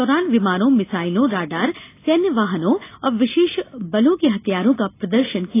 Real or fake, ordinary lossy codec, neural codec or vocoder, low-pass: real; AAC, 24 kbps; none; 3.6 kHz